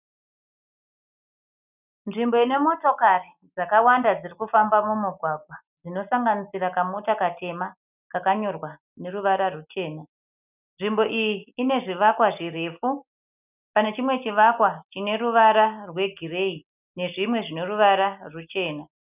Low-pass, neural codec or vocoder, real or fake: 3.6 kHz; none; real